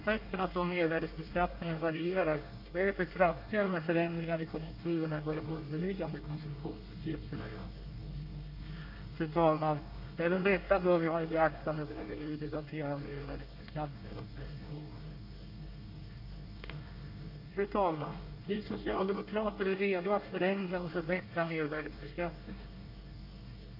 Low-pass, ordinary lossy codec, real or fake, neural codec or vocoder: 5.4 kHz; none; fake; codec, 24 kHz, 1 kbps, SNAC